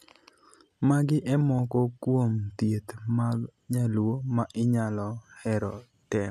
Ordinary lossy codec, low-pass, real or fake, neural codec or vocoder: none; 14.4 kHz; real; none